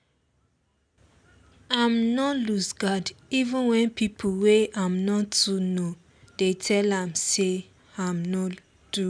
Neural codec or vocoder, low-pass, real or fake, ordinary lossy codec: none; 9.9 kHz; real; MP3, 96 kbps